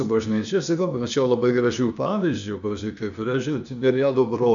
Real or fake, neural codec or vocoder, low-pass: fake; codec, 16 kHz, 0.8 kbps, ZipCodec; 7.2 kHz